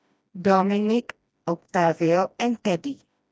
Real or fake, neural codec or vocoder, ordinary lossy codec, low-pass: fake; codec, 16 kHz, 1 kbps, FreqCodec, smaller model; none; none